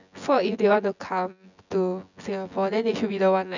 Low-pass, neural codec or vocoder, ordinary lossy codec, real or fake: 7.2 kHz; vocoder, 24 kHz, 100 mel bands, Vocos; none; fake